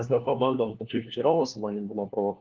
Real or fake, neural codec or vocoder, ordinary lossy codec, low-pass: fake; codec, 16 kHz, 1 kbps, FunCodec, trained on LibriTTS, 50 frames a second; Opus, 16 kbps; 7.2 kHz